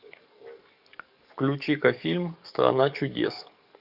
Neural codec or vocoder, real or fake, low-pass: codec, 44.1 kHz, 7.8 kbps, DAC; fake; 5.4 kHz